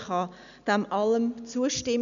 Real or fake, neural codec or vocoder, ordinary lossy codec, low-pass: real; none; none; 7.2 kHz